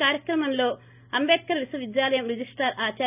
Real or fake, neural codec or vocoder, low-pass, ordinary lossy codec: real; none; 3.6 kHz; none